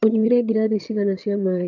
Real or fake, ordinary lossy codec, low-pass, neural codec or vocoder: fake; none; 7.2 kHz; vocoder, 22.05 kHz, 80 mel bands, HiFi-GAN